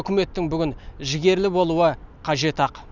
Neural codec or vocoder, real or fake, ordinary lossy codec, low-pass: none; real; none; 7.2 kHz